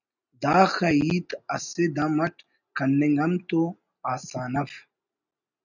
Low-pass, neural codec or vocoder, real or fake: 7.2 kHz; none; real